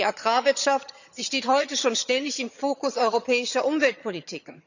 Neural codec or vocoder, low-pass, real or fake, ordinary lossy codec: vocoder, 22.05 kHz, 80 mel bands, HiFi-GAN; 7.2 kHz; fake; none